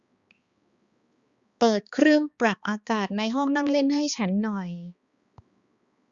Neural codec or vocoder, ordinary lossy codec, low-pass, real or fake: codec, 16 kHz, 2 kbps, X-Codec, HuBERT features, trained on balanced general audio; Opus, 64 kbps; 7.2 kHz; fake